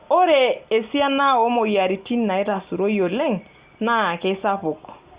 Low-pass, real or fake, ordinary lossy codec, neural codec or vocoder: 3.6 kHz; real; Opus, 64 kbps; none